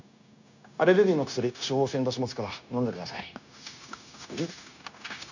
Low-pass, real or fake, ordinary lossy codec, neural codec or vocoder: 7.2 kHz; fake; none; codec, 16 kHz, 0.9 kbps, LongCat-Audio-Codec